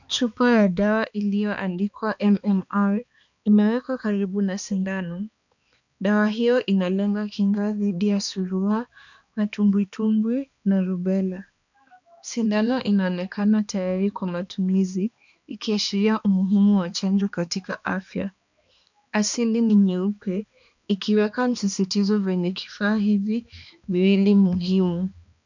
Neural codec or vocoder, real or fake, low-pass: codec, 16 kHz, 2 kbps, X-Codec, HuBERT features, trained on balanced general audio; fake; 7.2 kHz